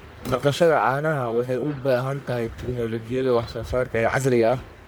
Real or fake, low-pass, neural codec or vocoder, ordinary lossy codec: fake; none; codec, 44.1 kHz, 1.7 kbps, Pupu-Codec; none